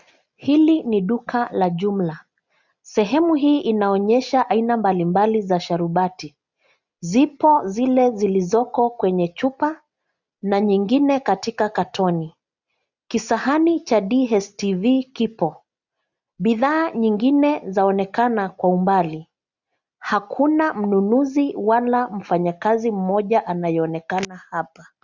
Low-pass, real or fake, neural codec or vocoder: 7.2 kHz; real; none